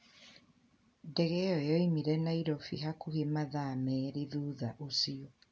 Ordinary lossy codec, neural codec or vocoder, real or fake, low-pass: none; none; real; none